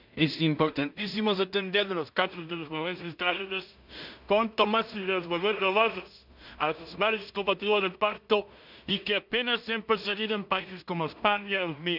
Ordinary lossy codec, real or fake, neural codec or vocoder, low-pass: none; fake; codec, 16 kHz in and 24 kHz out, 0.4 kbps, LongCat-Audio-Codec, two codebook decoder; 5.4 kHz